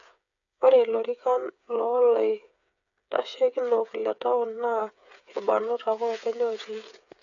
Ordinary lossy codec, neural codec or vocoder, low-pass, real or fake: none; codec, 16 kHz, 8 kbps, FreqCodec, smaller model; 7.2 kHz; fake